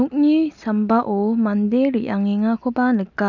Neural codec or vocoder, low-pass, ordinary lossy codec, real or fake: none; 7.2 kHz; none; real